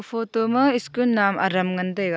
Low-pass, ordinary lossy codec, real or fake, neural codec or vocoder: none; none; real; none